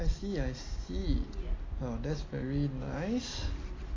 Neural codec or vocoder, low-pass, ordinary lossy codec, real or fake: none; 7.2 kHz; AAC, 32 kbps; real